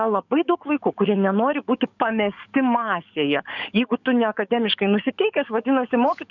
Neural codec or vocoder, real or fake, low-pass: codec, 44.1 kHz, 7.8 kbps, DAC; fake; 7.2 kHz